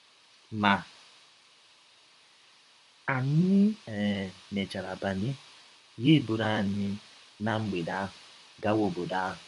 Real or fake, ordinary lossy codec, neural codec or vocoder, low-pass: fake; MP3, 48 kbps; vocoder, 44.1 kHz, 128 mel bands, Pupu-Vocoder; 14.4 kHz